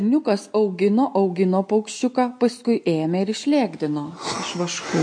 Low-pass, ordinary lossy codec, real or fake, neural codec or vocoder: 9.9 kHz; MP3, 48 kbps; real; none